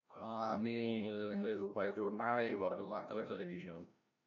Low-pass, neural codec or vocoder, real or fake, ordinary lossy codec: 7.2 kHz; codec, 16 kHz, 0.5 kbps, FreqCodec, larger model; fake; none